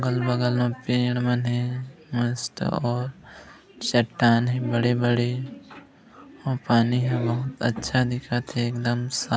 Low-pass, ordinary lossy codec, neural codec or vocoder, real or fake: none; none; none; real